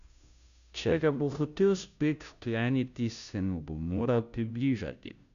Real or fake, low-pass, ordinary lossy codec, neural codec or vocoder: fake; 7.2 kHz; none; codec, 16 kHz, 0.5 kbps, FunCodec, trained on Chinese and English, 25 frames a second